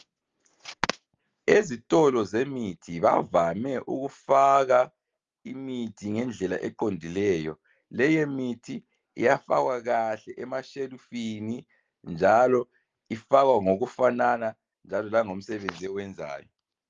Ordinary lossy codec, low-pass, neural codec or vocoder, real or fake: Opus, 32 kbps; 7.2 kHz; none; real